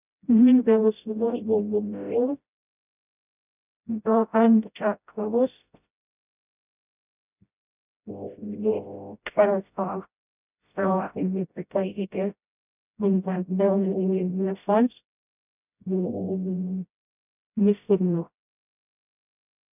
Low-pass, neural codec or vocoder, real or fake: 3.6 kHz; codec, 16 kHz, 0.5 kbps, FreqCodec, smaller model; fake